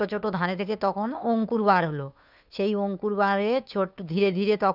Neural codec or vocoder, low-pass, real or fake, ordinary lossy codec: codec, 16 kHz, 2 kbps, FunCodec, trained on Chinese and English, 25 frames a second; 5.4 kHz; fake; none